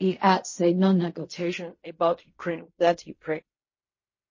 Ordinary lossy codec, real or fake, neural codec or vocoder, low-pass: MP3, 32 kbps; fake; codec, 16 kHz in and 24 kHz out, 0.4 kbps, LongCat-Audio-Codec, fine tuned four codebook decoder; 7.2 kHz